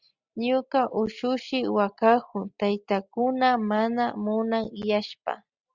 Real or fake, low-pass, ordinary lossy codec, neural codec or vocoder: real; 7.2 kHz; Opus, 64 kbps; none